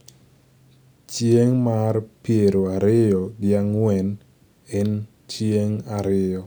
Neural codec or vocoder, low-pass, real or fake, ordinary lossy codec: none; none; real; none